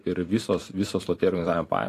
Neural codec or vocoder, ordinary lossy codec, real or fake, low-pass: vocoder, 44.1 kHz, 128 mel bands every 256 samples, BigVGAN v2; AAC, 48 kbps; fake; 14.4 kHz